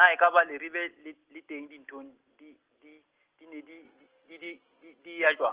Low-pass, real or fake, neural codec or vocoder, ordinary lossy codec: 3.6 kHz; real; none; Opus, 16 kbps